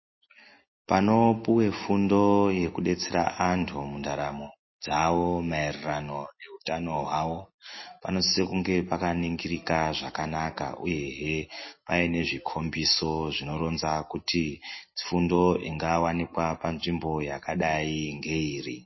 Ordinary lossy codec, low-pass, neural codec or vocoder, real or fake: MP3, 24 kbps; 7.2 kHz; none; real